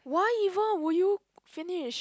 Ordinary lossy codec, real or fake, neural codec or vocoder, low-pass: none; real; none; none